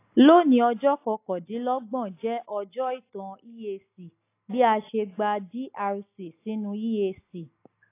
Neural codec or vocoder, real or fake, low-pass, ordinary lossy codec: none; real; 3.6 kHz; AAC, 24 kbps